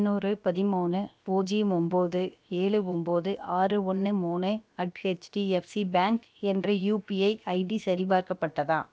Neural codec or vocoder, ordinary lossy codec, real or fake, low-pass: codec, 16 kHz, about 1 kbps, DyCAST, with the encoder's durations; none; fake; none